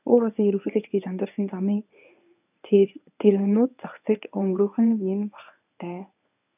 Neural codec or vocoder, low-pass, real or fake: codec, 24 kHz, 0.9 kbps, WavTokenizer, medium speech release version 1; 3.6 kHz; fake